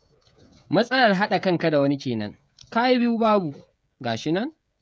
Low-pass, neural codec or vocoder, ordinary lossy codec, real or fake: none; codec, 16 kHz, 16 kbps, FreqCodec, smaller model; none; fake